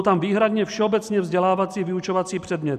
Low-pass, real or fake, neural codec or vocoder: 14.4 kHz; real; none